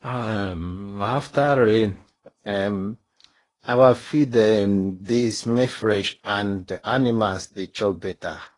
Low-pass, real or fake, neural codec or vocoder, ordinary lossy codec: 10.8 kHz; fake; codec, 16 kHz in and 24 kHz out, 0.8 kbps, FocalCodec, streaming, 65536 codes; AAC, 32 kbps